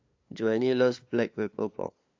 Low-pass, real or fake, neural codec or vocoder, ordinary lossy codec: 7.2 kHz; fake; codec, 16 kHz, 2 kbps, FunCodec, trained on LibriTTS, 25 frames a second; AAC, 48 kbps